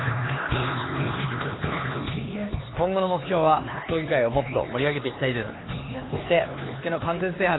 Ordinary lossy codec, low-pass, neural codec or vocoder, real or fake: AAC, 16 kbps; 7.2 kHz; codec, 16 kHz, 4 kbps, X-Codec, HuBERT features, trained on LibriSpeech; fake